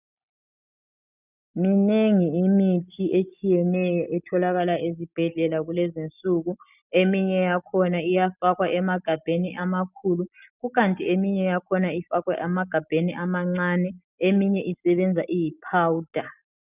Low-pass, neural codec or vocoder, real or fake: 3.6 kHz; none; real